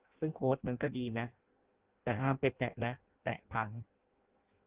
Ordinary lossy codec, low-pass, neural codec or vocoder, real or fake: Opus, 16 kbps; 3.6 kHz; codec, 16 kHz in and 24 kHz out, 0.6 kbps, FireRedTTS-2 codec; fake